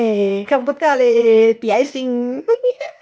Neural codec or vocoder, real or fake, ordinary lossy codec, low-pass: codec, 16 kHz, 0.8 kbps, ZipCodec; fake; none; none